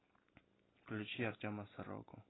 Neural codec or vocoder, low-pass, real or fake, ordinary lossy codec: none; 7.2 kHz; real; AAC, 16 kbps